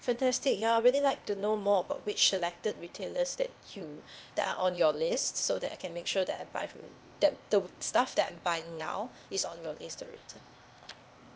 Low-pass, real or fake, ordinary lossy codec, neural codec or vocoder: none; fake; none; codec, 16 kHz, 0.8 kbps, ZipCodec